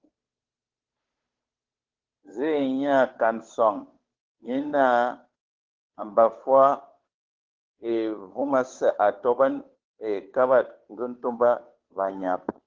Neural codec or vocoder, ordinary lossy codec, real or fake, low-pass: codec, 16 kHz, 2 kbps, FunCodec, trained on Chinese and English, 25 frames a second; Opus, 16 kbps; fake; 7.2 kHz